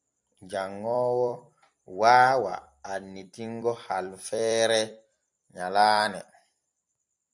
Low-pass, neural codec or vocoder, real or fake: 10.8 kHz; vocoder, 44.1 kHz, 128 mel bands every 256 samples, BigVGAN v2; fake